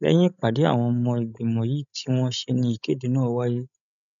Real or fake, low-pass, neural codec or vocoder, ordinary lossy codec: fake; 7.2 kHz; codec, 16 kHz, 16 kbps, FreqCodec, larger model; none